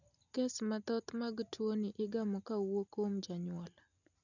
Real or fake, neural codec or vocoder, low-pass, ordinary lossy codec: real; none; 7.2 kHz; none